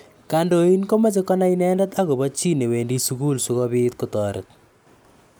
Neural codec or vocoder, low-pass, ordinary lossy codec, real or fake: none; none; none; real